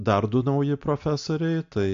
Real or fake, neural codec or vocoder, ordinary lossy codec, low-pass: real; none; AAC, 64 kbps; 7.2 kHz